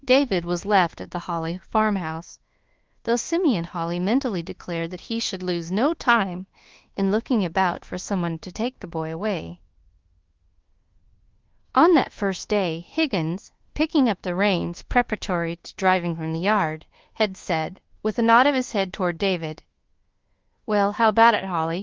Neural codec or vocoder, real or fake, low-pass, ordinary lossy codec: codec, 24 kHz, 1.2 kbps, DualCodec; fake; 7.2 kHz; Opus, 16 kbps